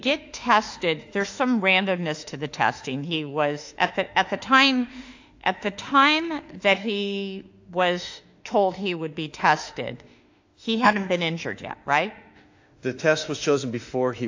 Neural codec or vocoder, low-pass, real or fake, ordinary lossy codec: autoencoder, 48 kHz, 32 numbers a frame, DAC-VAE, trained on Japanese speech; 7.2 kHz; fake; AAC, 48 kbps